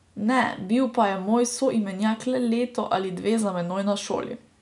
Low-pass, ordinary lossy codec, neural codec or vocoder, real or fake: 10.8 kHz; none; none; real